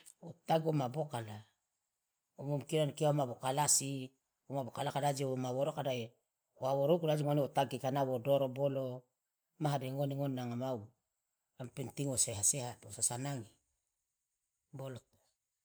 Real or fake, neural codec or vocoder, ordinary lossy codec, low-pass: fake; vocoder, 44.1 kHz, 128 mel bands every 512 samples, BigVGAN v2; none; none